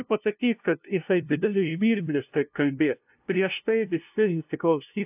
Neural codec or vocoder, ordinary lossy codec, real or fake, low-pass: codec, 16 kHz, 0.5 kbps, FunCodec, trained on LibriTTS, 25 frames a second; AAC, 32 kbps; fake; 3.6 kHz